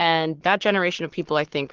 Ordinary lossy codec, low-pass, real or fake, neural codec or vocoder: Opus, 16 kbps; 7.2 kHz; fake; codec, 44.1 kHz, 7.8 kbps, Pupu-Codec